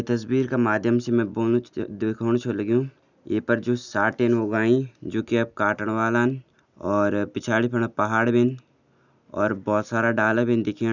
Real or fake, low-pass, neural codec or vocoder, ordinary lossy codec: real; 7.2 kHz; none; none